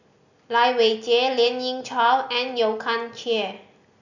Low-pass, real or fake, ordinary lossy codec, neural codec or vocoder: 7.2 kHz; real; none; none